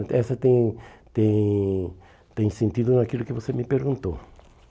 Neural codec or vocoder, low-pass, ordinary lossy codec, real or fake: none; none; none; real